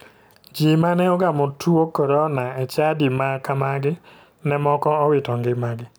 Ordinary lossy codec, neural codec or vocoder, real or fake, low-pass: none; none; real; none